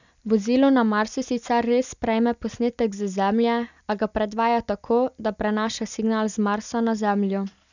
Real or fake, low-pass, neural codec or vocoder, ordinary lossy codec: real; 7.2 kHz; none; none